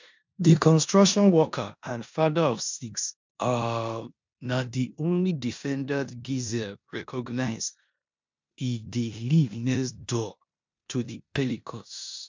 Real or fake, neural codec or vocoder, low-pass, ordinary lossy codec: fake; codec, 16 kHz in and 24 kHz out, 0.9 kbps, LongCat-Audio-Codec, four codebook decoder; 7.2 kHz; MP3, 64 kbps